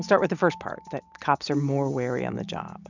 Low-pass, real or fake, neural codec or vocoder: 7.2 kHz; real; none